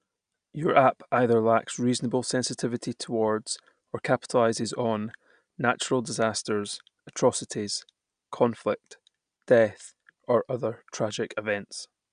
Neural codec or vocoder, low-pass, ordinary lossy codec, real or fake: none; 9.9 kHz; none; real